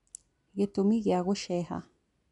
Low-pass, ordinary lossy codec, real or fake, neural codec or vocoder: 10.8 kHz; none; real; none